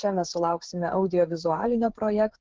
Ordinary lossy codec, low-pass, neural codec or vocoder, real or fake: Opus, 16 kbps; 7.2 kHz; codec, 16 kHz, 8 kbps, FreqCodec, smaller model; fake